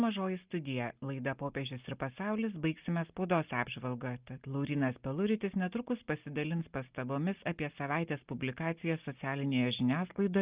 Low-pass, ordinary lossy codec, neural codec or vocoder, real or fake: 3.6 kHz; Opus, 24 kbps; none; real